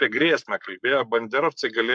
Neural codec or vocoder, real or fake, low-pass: codec, 44.1 kHz, 7.8 kbps, Pupu-Codec; fake; 9.9 kHz